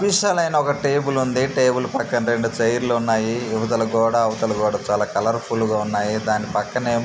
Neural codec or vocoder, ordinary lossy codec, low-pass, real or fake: none; none; none; real